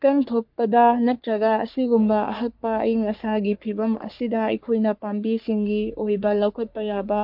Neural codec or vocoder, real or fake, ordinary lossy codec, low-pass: codec, 44.1 kHz, 3.4 kbps, Pupu-Codec; fake; MP3, 48 kbps; 5.4 kHz